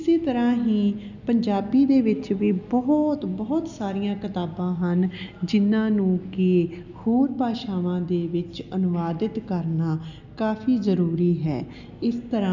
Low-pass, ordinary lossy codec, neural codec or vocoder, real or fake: 7.2 kHz; none; none; real